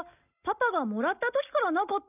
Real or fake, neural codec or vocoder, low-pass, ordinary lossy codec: real; none; 3.6 kHz; none